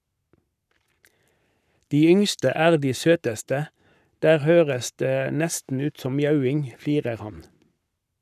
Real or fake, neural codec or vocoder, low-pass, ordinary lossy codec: fake; codec, 44.1 kHz, 7.8 kbps, Pupu-Codec; 14.4 kHz; none